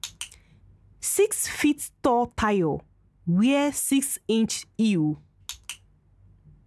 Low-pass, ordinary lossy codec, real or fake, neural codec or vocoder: none; none; real; none